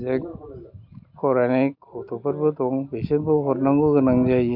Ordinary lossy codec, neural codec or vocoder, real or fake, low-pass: MP3, 48 kbps; none; real; 5.4 kHz